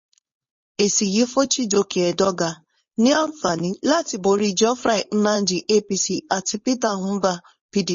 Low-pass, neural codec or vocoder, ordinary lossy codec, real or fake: 7.2 kHz; codec, 16 kHz, 4.8 kbps, FACodec; MP3, 32 kbps; fake